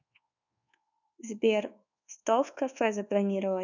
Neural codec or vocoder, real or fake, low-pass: codec, 24 kHz, 1.2 kbps, DualCodec; fake; 7.2 kHz